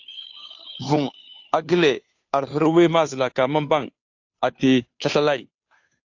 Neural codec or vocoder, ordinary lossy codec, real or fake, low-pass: codec, 16 kHz, 2 kbps, FunCodec, trained on Chinese and English, 25 frames a second; AAC, 48 kbps; fake; 7.2 kHz